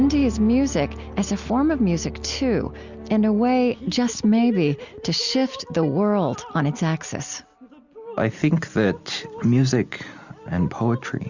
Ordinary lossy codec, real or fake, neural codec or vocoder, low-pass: Opus, 64 kbps; real; none; 7.2 kHz